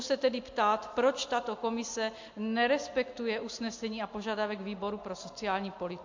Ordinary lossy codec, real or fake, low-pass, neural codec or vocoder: MP3, 48 kbps; real; 7.2 kHz; none